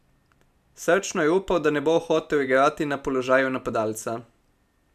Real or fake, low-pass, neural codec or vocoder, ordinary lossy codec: real; 14.4 kHz; none; none